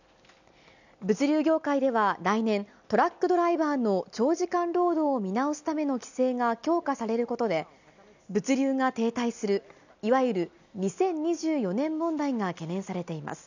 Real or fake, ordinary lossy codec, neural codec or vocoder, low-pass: real; none; none; 7.2 kHz